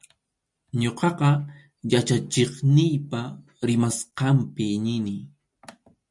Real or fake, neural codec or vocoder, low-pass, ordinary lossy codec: real; none; 10.8 kHz; MP3, 64 kbps